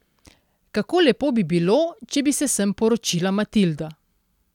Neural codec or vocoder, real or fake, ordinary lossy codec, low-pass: none; real; none; 19.8 kHz